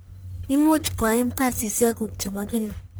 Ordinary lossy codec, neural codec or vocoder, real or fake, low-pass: none; codec, 44.1 kHz, 1.7 kbps, Pupu-Codec; fake; none